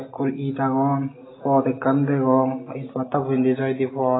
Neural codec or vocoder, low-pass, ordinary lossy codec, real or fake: none; 7.2 kHz; AAC, 16 kbps; real